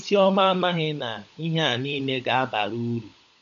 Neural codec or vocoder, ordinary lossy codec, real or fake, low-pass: codec, 16 kHz, 16 kbps, FunCodec, trained on LibriTTS, 50 frames a second; AAC, 96 kbps; fake; 7.2 kHz